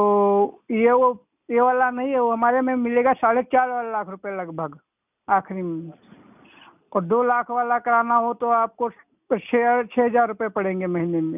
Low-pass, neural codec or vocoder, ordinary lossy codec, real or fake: 3.6 kHz; none; none; real